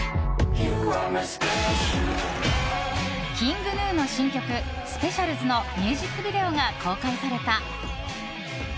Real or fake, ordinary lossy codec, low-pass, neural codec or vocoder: real; none; none; none